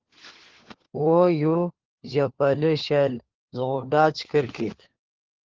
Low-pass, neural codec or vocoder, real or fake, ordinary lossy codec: 7.2 kHz; codec, 16 kHz, 4 kbps, FunCodec, trained on LibriTTS, 50 frames a second; fake; Opus, 16 kbps